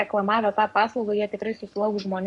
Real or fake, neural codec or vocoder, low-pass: fake; codec, 44.1 kHz, 7.8 kbps, Pupu-Codec; 10.8 kHz